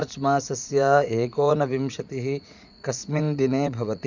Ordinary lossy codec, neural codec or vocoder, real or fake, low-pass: none; vocoder, 44.1 kHz, 80 mel bands, Vocos; fake; 7.2 kHz